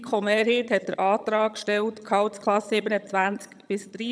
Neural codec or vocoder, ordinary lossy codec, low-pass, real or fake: vocoder, 22.05 kHz, 80 mel bands, HiFi-GAN; none; none; fake